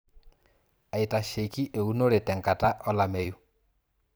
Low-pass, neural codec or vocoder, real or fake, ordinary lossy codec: none; vocoder, 44.1 kHz, 128 mel bands every 512 samples, BigVGAN v2; fake; none